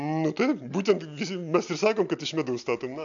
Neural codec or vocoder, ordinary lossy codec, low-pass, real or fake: none; AAC, 64 kbps; 7.2 kHz; real